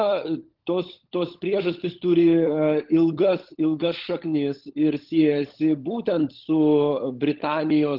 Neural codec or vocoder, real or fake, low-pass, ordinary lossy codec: codec, 16 kHz, 16 kbps, FunCodec, trained on LibriTTS, 50 frames a second; fake; 5.4 kHz; Opus, 16 kbps